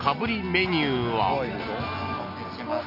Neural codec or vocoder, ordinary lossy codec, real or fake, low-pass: none; none; real; 5.4 kHz